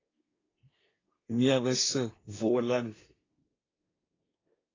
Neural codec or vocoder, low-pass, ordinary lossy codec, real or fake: codec, 24 kHz, 1 kbps, SNAC; 7.2 kHz; AAC, 32 kbps; fake